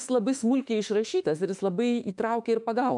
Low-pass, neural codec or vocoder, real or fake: 10.8 kHz; autoencoder, 48 kHz, 32 numbers a frame, DAC-VAE, trained on Japanese speech; fake